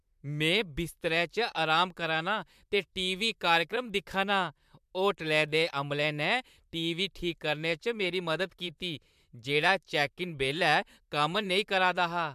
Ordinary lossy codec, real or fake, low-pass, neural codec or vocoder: MP3, 96 kbps; fake; 14.4 kHz; vocoder, 44.1 kHz, 128 mel bands, Pupu-Vocoder